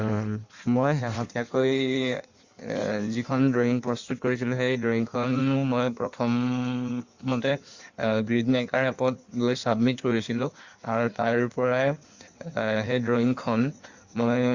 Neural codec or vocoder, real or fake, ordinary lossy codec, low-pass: codec, 16 kHz in and 24 kHz out, 1.1 kbps, FireRedTTS-2 codec; fake; Opus, 64 kbps; 7.2 kHz